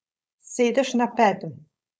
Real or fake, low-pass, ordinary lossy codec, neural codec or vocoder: fake; none; none; codec, 16 kHz, 4.8 kbps, FACodec